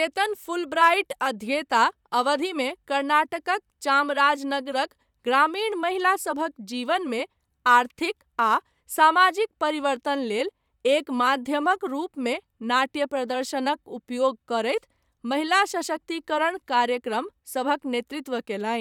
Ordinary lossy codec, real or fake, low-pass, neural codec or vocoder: none; fake; 19.8 kHz; vocoder, 44.1 kHz, 128 mel bands, Pupu-Vocoder